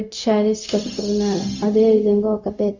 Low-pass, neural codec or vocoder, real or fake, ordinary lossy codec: 7.2 kHz; codec, 16 kHz, 0.4 kbps, LongCat-Audio-Codec; fake; none